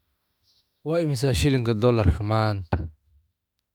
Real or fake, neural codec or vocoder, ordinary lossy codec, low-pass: fake; autoencoder, 48 kHz, 32 numbers a frame, DAC-VAE, trained on Japanese speech; none; 19.8 kHz